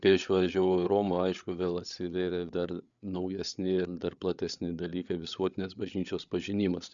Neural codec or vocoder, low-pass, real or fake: codec, 16 kHz, 8 kbps, FreqCodec, larger model; 7.2 kHz; fake